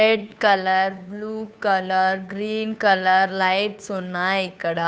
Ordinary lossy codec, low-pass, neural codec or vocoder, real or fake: none; none; codec, 16 kHz, 2 kbps, FunCodec, trained on Chinese and English, 25 frames a second; fake